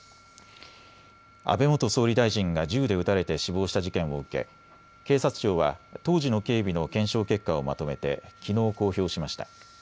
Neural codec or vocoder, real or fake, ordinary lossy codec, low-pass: none; real; none; none